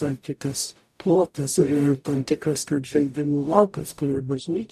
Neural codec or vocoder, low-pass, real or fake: codec, 44.1 kHz, 0.9 kbps, DAC; 14.4 kHz; fake